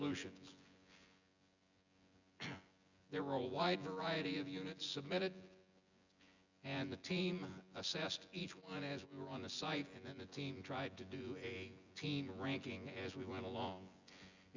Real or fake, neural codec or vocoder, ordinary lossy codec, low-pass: fake; vocoder, 24 kHz, 100 mel bands, Vocos; Opus, 64 kbps; 7.2 kHz